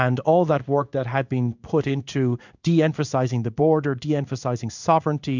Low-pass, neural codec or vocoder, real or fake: 7.2 kHz; codec, 16 kHz in and 24 kHz out, 1 kbps, XY-Tokenizer; fake